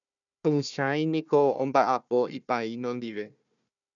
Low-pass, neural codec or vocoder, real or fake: 7.2 kHz; codec, 16 kHz, 1 kbps, FunCodec, trained on Chinese and English, 50 frames a second; fake